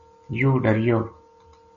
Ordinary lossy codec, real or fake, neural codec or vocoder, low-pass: MP3, 32 kbps; real; none; 7.2 kHz